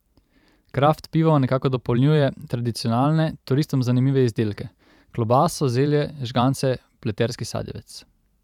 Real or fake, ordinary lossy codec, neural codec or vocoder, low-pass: fake; none; vocoder, 44.1 kHz, 128 mel bands every 512 samples, BigVGAN v2; 19.8 kHz